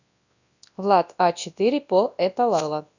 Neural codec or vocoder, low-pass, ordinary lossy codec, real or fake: codec, 24 kHz, 0.9 kbps, WavTokenizer, large speech release; 7.2 kHz; none; fake